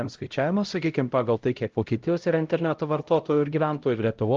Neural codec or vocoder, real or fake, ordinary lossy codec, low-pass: codec, 16 kHz, 0.5 kbps, X-Codec, HuBERT features, trained on LibriSpeech; fake; Opus, 32 kbps; 7.2 kHz